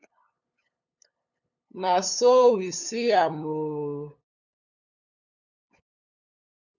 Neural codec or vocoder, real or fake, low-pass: codec, 16 kHz, 8 kbps, FunCodec, trained on LibriTTS, 25 frames a second; fake; 7.2 kHz